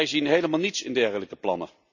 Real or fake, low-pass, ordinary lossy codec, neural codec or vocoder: real; 7.2 kHz; none; none